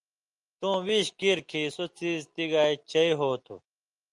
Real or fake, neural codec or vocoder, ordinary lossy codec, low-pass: real; none; Opus, 32 kbps; 10.8 kHz